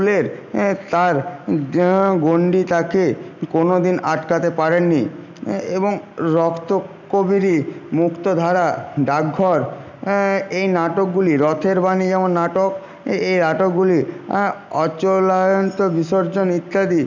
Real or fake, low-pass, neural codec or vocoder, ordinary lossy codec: real; 7.2 kHz; none; none